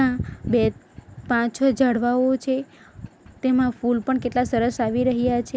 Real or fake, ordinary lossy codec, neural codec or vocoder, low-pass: real; none; none; none